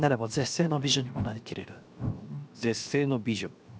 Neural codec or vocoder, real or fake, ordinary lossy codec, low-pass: codec, 16 kHz, 0.7 kbps, FocalCodec; fake; none; none